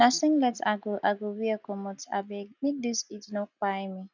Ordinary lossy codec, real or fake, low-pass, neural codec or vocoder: none; real; 7.2 kHz; none